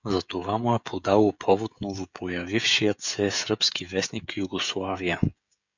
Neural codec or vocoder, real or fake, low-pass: codec, 16 kHz, 16 kbps, FreqCodec, smaller model; fake; 7.2 kHz